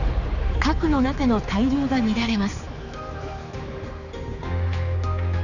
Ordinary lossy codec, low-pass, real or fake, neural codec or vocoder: none; 7.2 kHz; fake; codec, 16 kHz, 4 kbps, X-Codec, HuBERT features, trained on general audio